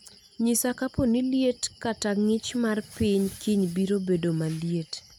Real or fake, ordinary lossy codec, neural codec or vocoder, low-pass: real; none; none; none